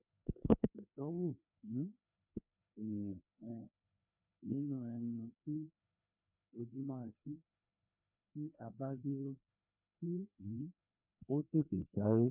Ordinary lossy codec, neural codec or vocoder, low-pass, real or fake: none; codec, 16 kHz, 2 kbps, FreqCodec, larger model; 3.6 kHz; fake